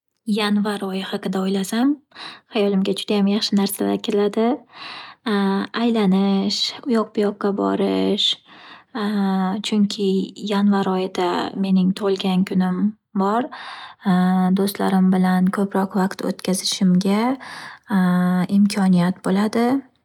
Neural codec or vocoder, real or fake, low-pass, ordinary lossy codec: none; real; 19.8 kHz; none